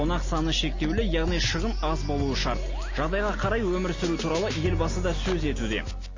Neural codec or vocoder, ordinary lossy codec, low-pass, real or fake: none; MP3, 32 kbps; 7.2 kHz; real